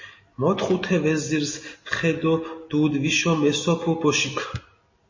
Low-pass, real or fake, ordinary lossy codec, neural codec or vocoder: 7.2 kHz; real; MP3, 32 kbps; none